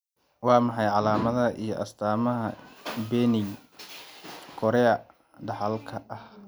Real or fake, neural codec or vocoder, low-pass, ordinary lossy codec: real; none; none; none